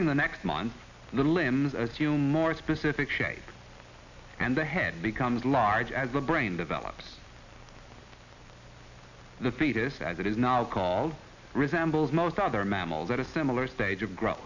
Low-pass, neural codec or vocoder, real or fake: 7.2 kHz; none; real